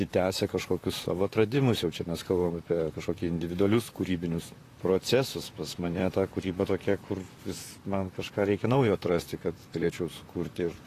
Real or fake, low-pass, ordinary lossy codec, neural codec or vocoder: fake; 14.4 kHz; AAC, 48 kbps; vocoder, 44.1 kHz, 128 mel bands, Pupu-Vocoder